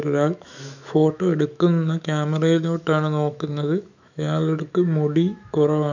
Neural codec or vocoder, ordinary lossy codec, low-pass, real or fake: autoencoder, 48 kHz, 128 numbers a frame, DAC-VAE, trained on Japanese speech; none; 7.2 kHz; fake